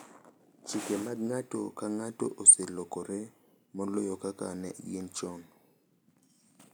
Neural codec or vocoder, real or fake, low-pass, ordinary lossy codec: none; real; none; none